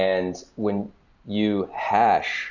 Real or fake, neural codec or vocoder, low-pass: real; none; 7.2 kHz